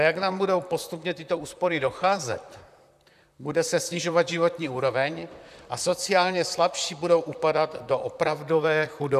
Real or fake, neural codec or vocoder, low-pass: fake; vocoder, 44.1 kHz, 128 mel bands, Pupu-Vocoder; 14.4 kHz